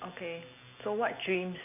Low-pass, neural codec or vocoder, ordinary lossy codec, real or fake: 3.6 kHz; none; none; real